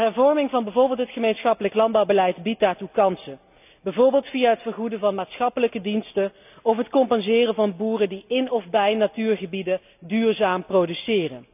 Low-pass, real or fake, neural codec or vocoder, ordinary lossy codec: 3.6 kHz; real; none; none